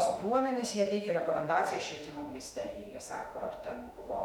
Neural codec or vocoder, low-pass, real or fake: autoencoder, 48 kHz, 32 numbers a frame, DAC-VAE, trained on Japanese speech; 19.8 kHz; fake